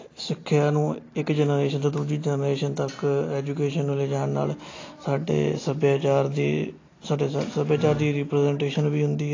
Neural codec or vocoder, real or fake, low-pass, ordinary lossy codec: none; real; 7.2 kHz; AAC, 32 kbps